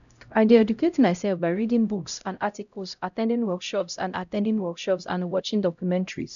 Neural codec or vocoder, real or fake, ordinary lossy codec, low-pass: codec, 16 kHz, 0.5 kbps, X-Codec, HuBERT features, trained on LibriSpeech; fake; none; 7.2 kHz